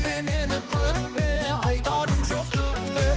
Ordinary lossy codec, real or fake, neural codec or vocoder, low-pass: none; fake; codec, 16 kHz, 2 kbps, X-Codec, HuBERT features, trained on balanced general audio; none